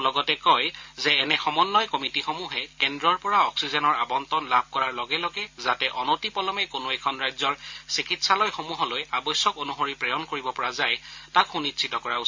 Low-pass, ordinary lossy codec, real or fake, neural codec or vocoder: 7.2 kHz; MP3, 48 kbps; real; none